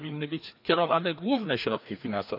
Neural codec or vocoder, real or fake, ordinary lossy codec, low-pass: codec, 16 kHz, 2 kbps, FreqCodec, larger model; fake; none; 5.4 kHz